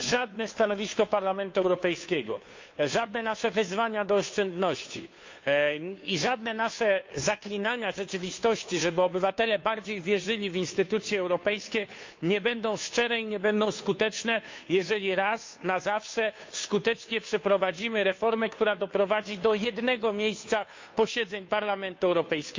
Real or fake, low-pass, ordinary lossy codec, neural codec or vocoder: fake; 7.2 kHz; MP3, 48 kbps; codec, 16 kHz, 2 kbps, FunCodec, trained on Chinese and English, 25 frames a second